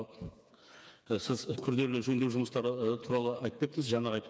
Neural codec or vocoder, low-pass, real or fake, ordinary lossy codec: codec, 16 kHz, 4 kbps, FreqCodec, smaller model; none; fake; none